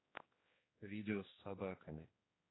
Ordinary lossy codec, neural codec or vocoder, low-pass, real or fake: AAC, 16 kbps; codec, 16 kHz, 2 kbps, X-Codec, HuBERT features, trained on general audio; 7.2 kHz; fake